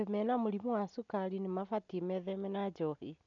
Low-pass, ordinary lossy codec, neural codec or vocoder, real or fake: 7.2 kHz; none; none; real